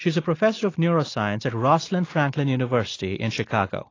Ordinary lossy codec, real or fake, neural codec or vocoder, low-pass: AAC, 32 kbps; real; none; 7.2 kHz